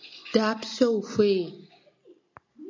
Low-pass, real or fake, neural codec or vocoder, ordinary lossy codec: 7.2 kHz; real; none; MP3, 64 kbps